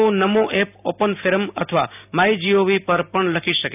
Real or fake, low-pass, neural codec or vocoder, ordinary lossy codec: real; 3.6 kHz; none; none